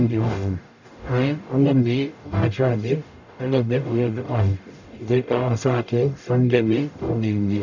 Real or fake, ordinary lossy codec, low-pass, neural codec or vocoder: fake; none; 7.2 kHz; codec, 44.1 kHz, 0.9 kbps, DAC